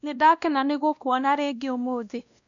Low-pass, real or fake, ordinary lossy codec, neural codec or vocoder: 7.2 kHz; fake; none; codec, 16 kHz, 1 kbps, X-Codec, WavLM features, trained on Multilingual LibriSpeech